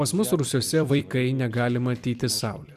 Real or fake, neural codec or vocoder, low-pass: fake; vocoder, 44.1 kHz, 128 mel bands every 256 samples, BigVGAN v2; 14.4 kHz